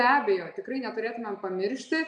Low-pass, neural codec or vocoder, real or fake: 9.9 kHz; none; real